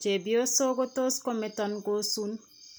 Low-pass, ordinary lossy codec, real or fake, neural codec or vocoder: none; none; real; none